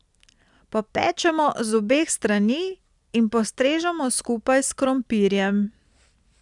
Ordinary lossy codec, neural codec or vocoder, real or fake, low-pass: none; none; real; 10.8 kHz